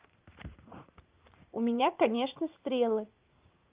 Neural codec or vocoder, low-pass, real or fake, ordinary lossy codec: vocoder, 44.1 kHz, 80 mel bands, Vocos; 3.6 kHz; fake; Opus, 32 kbps